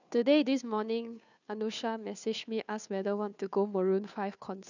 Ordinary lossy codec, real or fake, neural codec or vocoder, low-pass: none; fake; codec, 16 kHz, 2 kbps, FunCodec, trained on Chinese and English, 25 frames a second; 7.2 kHz